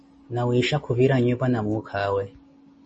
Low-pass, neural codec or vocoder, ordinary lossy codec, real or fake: 10.8 kHz; none; MP3, 32 kbps; real